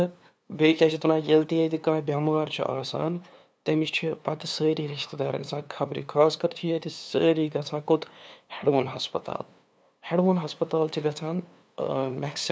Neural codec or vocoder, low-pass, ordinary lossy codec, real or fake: codec, 16 kHz, 2 kbps, FunCodec, trained on LibriTTS, 25 frames a second; none; none; fake